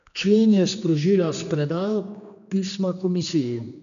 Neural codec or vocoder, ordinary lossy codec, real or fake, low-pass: codec, 16 kHz, 2 kbps, X-Codec, HuBERT features, trained on general audio; none; fake; 7.2 kHz